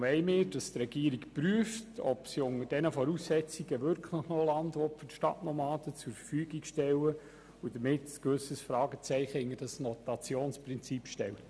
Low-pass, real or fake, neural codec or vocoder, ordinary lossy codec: none; real; none; none